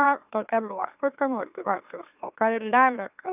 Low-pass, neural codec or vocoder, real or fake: 3.6 kHz; autoencoder, 44.1 kHz, a latent of 192 numbers a frame, MeloTTS; fake